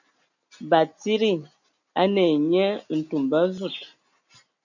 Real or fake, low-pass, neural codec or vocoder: real; 7.2 kHz; none